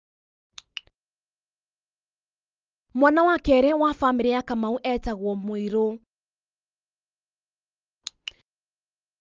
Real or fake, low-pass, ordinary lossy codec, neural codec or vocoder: real; 7.2 kHz; Opus, 24 kbps; none